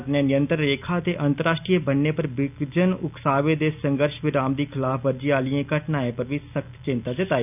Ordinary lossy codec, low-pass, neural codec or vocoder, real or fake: none; 3.6 kHz; none; real